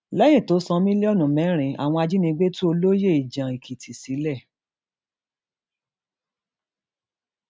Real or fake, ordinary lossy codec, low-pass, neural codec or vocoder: real; none; none; none